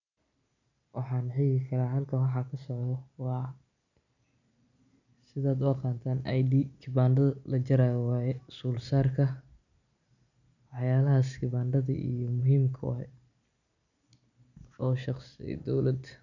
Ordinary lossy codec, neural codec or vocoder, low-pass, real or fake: none; none; 7.2 kHz; real